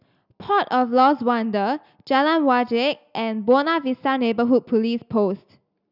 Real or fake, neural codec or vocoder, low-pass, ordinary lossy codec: real; none; 5.4 kHz; none